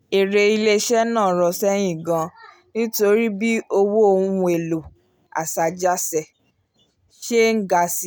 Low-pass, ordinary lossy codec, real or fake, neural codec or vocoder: none; none; real; none